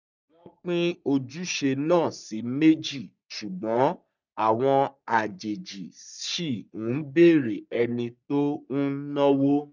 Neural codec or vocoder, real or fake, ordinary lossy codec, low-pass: codec, 44.1 kHz, 3.4 kbps, Pupu-Codec; fake; none; 7.2 kHz